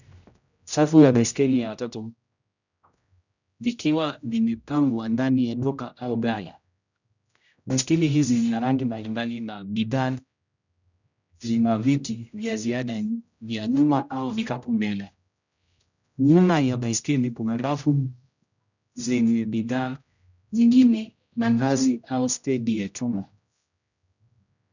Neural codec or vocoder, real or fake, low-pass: codec, 16 kHz, 0.5 kbps, X-Codec, HuBERT features, trained on general audio; fake; 7.2 kHz